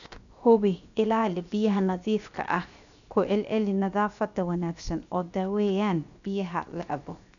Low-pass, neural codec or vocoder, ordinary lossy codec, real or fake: 7.2 kHz; codec, 16 kHz, 0.7 kbps, FocalCodec; none; fake